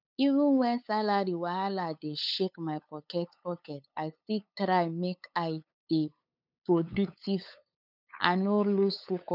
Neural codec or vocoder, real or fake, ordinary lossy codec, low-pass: codec, 16 kHz, 8 kbps, FunCodec, trained on LibriTTS, 25 frames a second; fake; none; 5.4 kHz